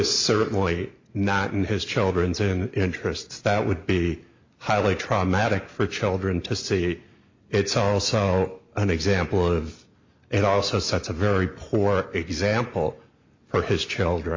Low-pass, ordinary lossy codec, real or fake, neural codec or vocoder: 7.2 kHz; MP3, 48 kbps; real; none